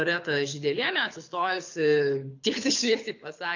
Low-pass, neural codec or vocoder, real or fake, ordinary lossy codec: 7.2 kHz; codec, 24 kHz, 6 kbps, HILCodec; fake; AAC, 48 kbps